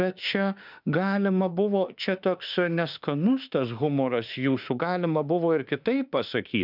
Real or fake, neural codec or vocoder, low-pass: fake; autoencoder, 48 kHz, 32 numbers a frame, DAC-VAE, trained on Japanese speech; 5.4 kHz